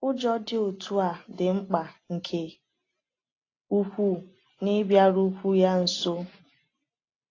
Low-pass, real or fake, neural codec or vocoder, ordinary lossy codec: 7.2 kHz; real; none; AAC, 32 kbps